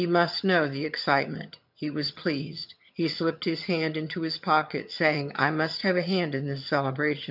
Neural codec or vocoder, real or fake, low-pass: vocoder, 22.05 kHz, 80 mel bands, HiFi-GAN; fake; 5.4 kHz